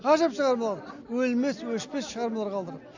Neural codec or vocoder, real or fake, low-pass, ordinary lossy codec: none; real; 7.2 kHz; AAC, 48 kbps